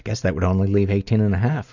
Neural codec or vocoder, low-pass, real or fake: none; 7.2 kHz; real